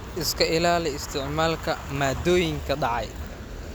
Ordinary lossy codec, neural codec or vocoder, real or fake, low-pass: none; none; real; none